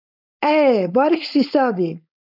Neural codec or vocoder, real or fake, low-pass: codec, 16 kHz, 4.8 kbps, FACodec; fake; 5.4 kHz